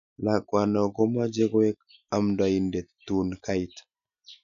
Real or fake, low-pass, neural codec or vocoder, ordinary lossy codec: real; 7.2 kHz; none; none